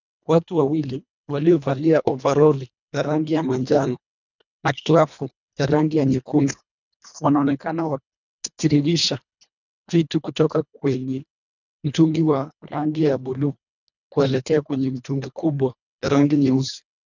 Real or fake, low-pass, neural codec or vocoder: fake; 7.2 kHz; codec, 24 kHz, 1.5 kbps, HILCodec